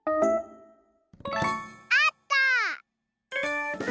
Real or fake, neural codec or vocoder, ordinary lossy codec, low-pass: real; none; none; none